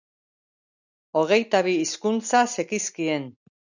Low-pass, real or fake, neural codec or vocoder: 7.2 kHz; real; none